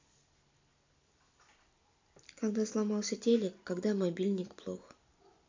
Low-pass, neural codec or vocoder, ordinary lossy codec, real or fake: 7.2 kHz; none; MP3, 64 kbps; real